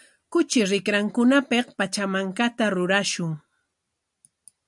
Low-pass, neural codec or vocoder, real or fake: 10.8 kHz; none; real